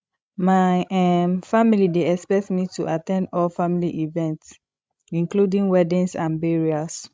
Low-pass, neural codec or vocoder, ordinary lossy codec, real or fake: none; codec, 16 kHz, 16 kbps, FreqCodec, larger model; none; fake